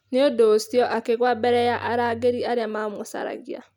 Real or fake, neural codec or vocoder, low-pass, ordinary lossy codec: real; none; 19.8 kHz; none